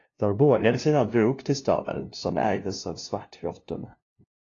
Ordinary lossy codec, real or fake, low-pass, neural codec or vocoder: AAC, 32 kbps; fake; 7.2 kHz; codec, 16 kHz, 0.5 kbps, FunCodec, trained on LibriTTS, 25 frames a second